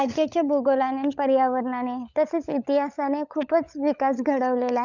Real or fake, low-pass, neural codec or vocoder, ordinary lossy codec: fake; 7.2 kHz; codec, 16 kHz, 16 kbps, FunCodec, trained on LibriTTS, 50 frames a second; none